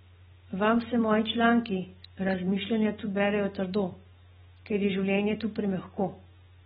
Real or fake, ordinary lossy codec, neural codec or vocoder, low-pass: real; AAC, 16 kbps; none; 14.4 kHz